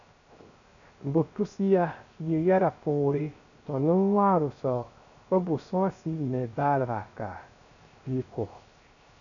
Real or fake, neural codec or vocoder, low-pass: fake; codec, 16 kHz, 0.3 kbps, FocalCodec; 7.2 kHz